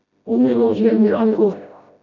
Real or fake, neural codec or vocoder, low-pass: fake; codec, 16 kHz, 0.5 kbps, FreqCodec, smaller model; 7.2 kHz